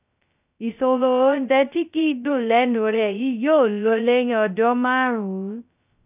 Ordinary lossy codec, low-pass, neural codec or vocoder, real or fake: none; 3.6 kHz; codec, 16 kHz, 0.2 kbps, FocalCodec; fake